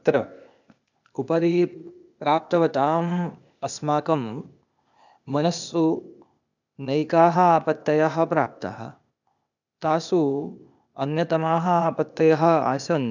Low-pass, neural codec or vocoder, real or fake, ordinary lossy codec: 7.2 kHz; codec, 16 kHz, 0.8 kbps, ZipCodec; fake; none